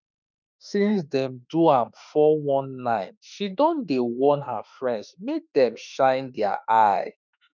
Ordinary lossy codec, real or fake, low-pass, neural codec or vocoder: none; fake; 7.2 kHz; autoencoder, 48 kHz, 32 numbers a frame, DAC-VAE, trained on Japanese speech